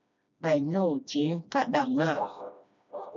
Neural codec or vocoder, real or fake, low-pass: codec, 16 kHz, 1 kbps, FreqCodec, smaller model; fake; 7.2 kHz